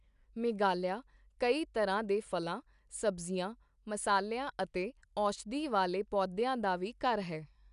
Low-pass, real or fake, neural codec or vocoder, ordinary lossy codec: 10.8 kHz; real; none; none